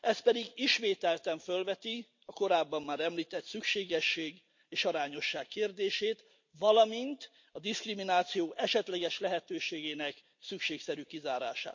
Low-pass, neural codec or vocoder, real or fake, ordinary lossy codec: 7.2 kHz; none; real; MP3, 48 kbps